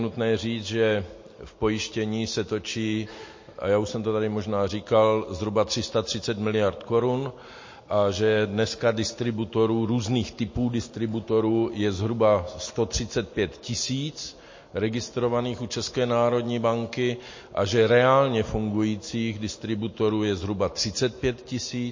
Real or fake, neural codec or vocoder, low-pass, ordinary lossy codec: real; none; 7.2 kHz; MP3, 32 kbps